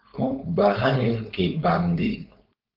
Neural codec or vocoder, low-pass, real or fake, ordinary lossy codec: codec, 16 kHz, 4.8 kbps, FACodec; 5.4 kHz; fake; Opus, 24 kbps